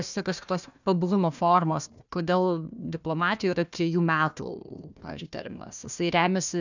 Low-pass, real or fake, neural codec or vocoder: 7.2 kHz; fake; codec, 16 kHz, 1 kbps, FunCodec, trained on Chinese and English, 50 frames a second